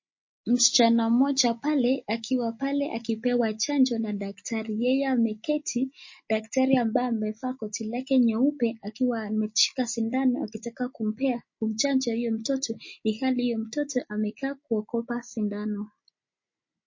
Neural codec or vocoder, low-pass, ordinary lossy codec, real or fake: none; 7.2 kHz; MP3, 32 kbps; real